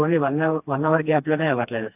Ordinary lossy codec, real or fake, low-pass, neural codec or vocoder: AAC, 32 kbps; fake; 3.6 kHz; codec, 16 kHz, 2 kbps, FreqCodec, smaller model